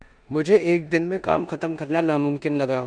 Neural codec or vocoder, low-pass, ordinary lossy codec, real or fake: codec, 16 kHz in and 24 kHz out, 0.9 kbps, LongCat-Audio-Codec, four codebook decoder; 9.9 kHz; Opus, 64 kbps; fake